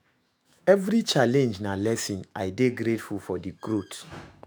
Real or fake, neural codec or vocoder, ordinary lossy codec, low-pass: fake; autoencoder, 48 kHz, 128 numbers a frame, DAC-VAE, trained on Japanese speech; none; none